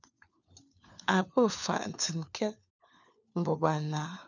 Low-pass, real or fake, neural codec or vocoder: 7.2 kHz; fake; codec, 16 kHz, 4 kbps, FunCodec, trained on LibriTTS, 50 frames a second